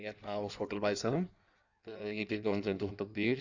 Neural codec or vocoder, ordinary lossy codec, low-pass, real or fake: codec, 16 kHz in and 24 kHz out, 1.1 kbps, FireRedTTS-2 codec; Opus, 64 kbps; 7.2 kHz; fake